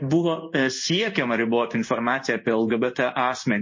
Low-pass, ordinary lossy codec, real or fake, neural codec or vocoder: 7.2 kHz; MP3, 32 kbps; fake; codec, 16 kHz in and 24 kHz out, 1 kbps, XY-Tokenizer